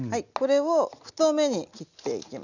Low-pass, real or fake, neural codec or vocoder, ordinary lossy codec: 7.2 kHz; real; none; none